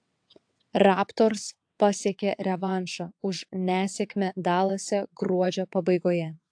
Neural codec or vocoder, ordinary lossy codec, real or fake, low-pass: vocoder, 22.05 kHz, 80 mel bands, WaveNeXt; AAC, 64 kbps; fake; 9.9 kHz